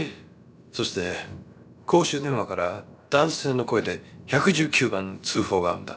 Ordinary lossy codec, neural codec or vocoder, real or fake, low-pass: none; codec, 16 kHz, about 1 kbps, DyCAST, with the encoder's durations; fake; none